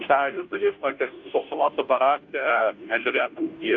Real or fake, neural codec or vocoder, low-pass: fake; codec, 16 kHz, 0.5 kbps, FunCodec, trained on Chinese and English, 25 frames a second; 7.2 kHz